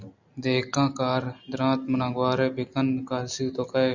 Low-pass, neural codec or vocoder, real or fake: 7.2 kHz; none; real